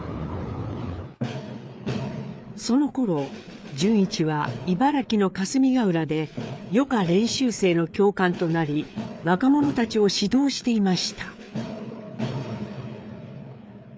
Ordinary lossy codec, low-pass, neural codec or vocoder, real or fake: none; none; codec, 16 kHz, 4 kbps, FreqCodec, larger model; fake